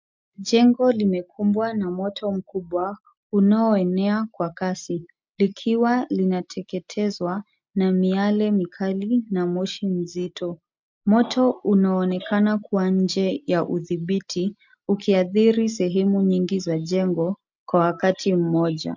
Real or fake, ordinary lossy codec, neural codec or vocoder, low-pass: real; MP3, 64 kbps; none; 7.2 kHz